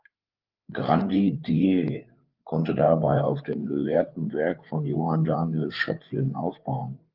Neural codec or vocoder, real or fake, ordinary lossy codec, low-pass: codec, 16 kHz, 4 kbps, FreqCodec, larger model; fake; Opus, 32 kbps; 5.4 kHz